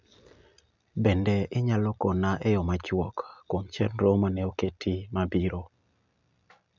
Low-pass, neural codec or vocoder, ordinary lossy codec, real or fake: 7.2 kHz; none; none; real